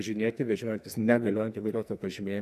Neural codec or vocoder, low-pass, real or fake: codec, 44.1 kHz, 2.6 kbps, SNAC; 14.4 kHz; fake